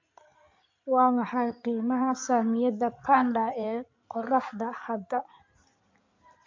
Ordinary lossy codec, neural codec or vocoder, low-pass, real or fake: MP3, 48 kbps; codec, 16 kHz in and 24 kHz out, 2.2 kbps, FireRedTTS-2 codec; 7.2 kHz; fake